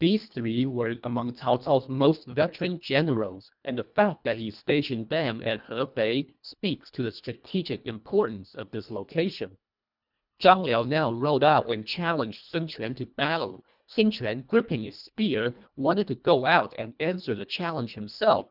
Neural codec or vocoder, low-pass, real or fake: codec, 24 kHz, 1.5 kbps, HILCodec; 5.4 kHz; fake